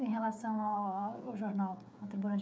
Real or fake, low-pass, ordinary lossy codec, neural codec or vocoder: fake; none; none; codec, 16 kHz, 16 kbps, FreqCodec, smaller model